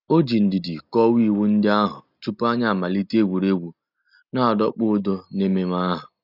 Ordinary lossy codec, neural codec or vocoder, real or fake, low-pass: none; none; real; 5.4 kHz